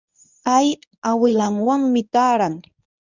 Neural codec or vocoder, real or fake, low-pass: codec, 24 kHz, 0.9 kbps, WavTokenizer, medium speech release version 1; fake; 7.2 kHz